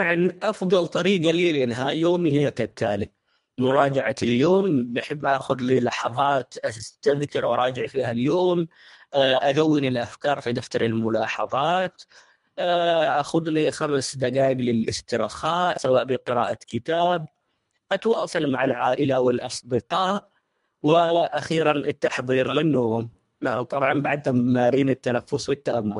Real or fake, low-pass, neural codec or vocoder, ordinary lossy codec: fake; 10.8 kHz; codec, 24 kHz, 1.5 kbps, HILCodec; MP3, 64 kbps